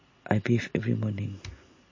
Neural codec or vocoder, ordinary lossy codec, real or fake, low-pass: none; MP3, 32 kbps; real; 7.2 kHz